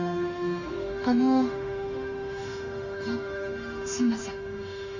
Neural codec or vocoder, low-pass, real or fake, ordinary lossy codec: autoencoder, 48 kHz, 32 numbers a frame, DAC-VAE, trained on Japanese speech; 7.2 kHz; fake; none